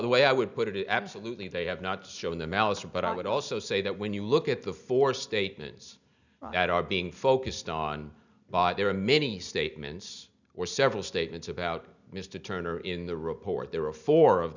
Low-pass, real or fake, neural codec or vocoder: 7.2 kHz; real; none